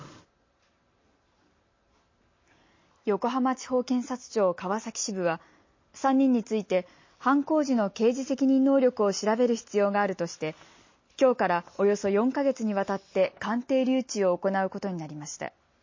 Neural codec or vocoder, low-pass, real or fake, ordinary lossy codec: none; 7.2 kHz; real; MP3, 32 kbps